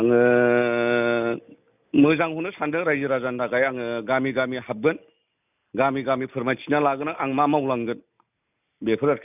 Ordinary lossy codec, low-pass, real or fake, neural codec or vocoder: none; 3.6 kHz; real; none